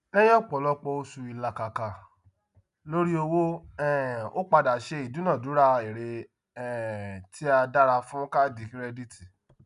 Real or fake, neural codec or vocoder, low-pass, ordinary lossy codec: real; none; 10.8 kHz; none